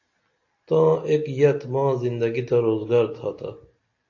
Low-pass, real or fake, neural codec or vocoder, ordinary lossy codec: 7.2 kHz; real; none; AAC, 48 kbps